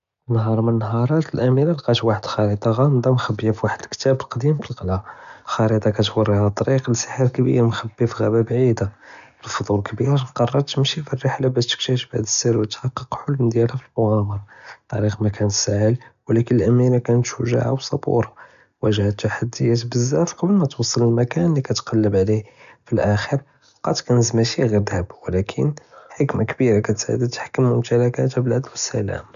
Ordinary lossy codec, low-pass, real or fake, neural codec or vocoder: none; 7.2 kHz; real; none